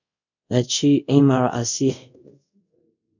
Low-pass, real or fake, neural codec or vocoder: 7.2 kHz; fake; codec, 24 kHz, 0.5 kbps, DualCodec